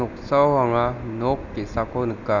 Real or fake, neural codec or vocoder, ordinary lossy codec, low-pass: real; none; none; 7.2 kHz